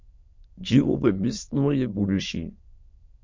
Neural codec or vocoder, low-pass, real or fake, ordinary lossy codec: autoencoder, 22.05 kHz, a latent of 192 numbers a frame, VITS, trained on many speakers; 7.2 kHz; fake; MP3, 48 kbps